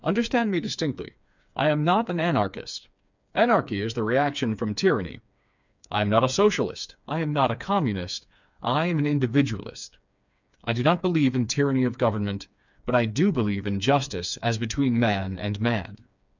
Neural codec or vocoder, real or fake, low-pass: codec, 16 kHz, 4 kbps, FreqCodec, smaller model; fake; 7.2 kHz